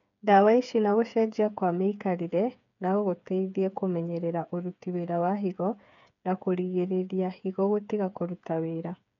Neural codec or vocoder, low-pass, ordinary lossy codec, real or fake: codec, 16 kHz, 8 kbps, FreqCodec, smaller model; 7.2 kHz; none; fake